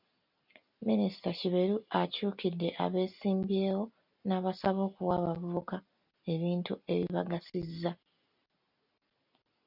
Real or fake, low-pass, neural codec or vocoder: real; 5.4 kHz; none